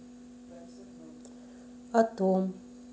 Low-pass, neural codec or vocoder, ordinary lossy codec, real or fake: none; none; none; real